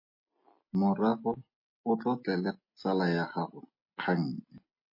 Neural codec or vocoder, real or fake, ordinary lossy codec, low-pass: none; real; MP3, 24 kbps; 5.4 kHz